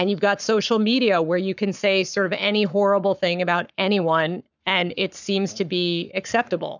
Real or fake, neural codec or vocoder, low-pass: fake; codec, 44.1 kHz, 7.8 kbps, Pupu-Codec; 7.2 kHz